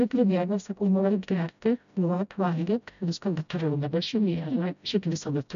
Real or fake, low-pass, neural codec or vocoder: fake; 7.2 kHz; codec, 16 kHz, 0.5 kbps, FreqCodec, smaller model